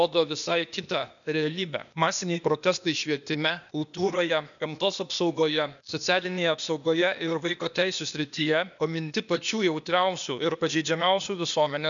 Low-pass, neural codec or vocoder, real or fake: 7.2 kHz; codec, 16 kHz, 0.8 kbps, ZipCodec; fake